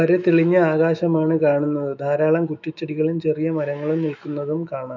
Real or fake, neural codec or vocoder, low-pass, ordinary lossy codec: fake; autoencoder, 48 kHz, 128 numbers a frame, DAC-VAE, trained on Japanese speech; 7.2 kHz; none